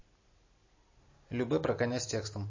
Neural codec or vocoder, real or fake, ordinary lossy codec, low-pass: none; real; MP3, 32 kbps; 7.2 kHz